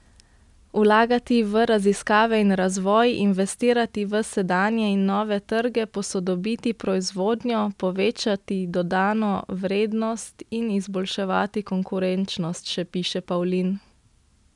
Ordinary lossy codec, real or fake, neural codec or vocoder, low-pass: none; real; none; 10.8 kHz